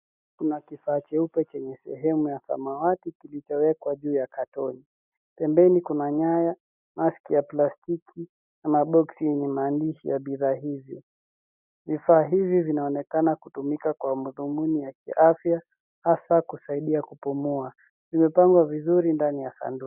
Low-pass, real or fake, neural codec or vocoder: 3.6 kHz; real; none